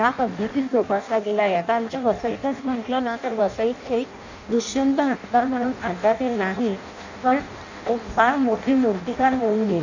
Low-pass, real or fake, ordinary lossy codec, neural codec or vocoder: 7.2 kHz; fake; none; codec, 16 kHz in and 24 kHz out, 0.6 kbps, FireRedTTS-2 codec